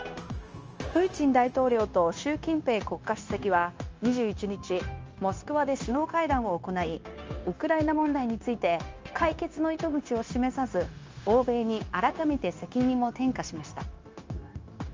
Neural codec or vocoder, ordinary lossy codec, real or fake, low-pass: codec, 16 kHz, 0.9 kbps, LongCat-Audio-Codec; Opus, 24 kbps; fake; 7.2 kHz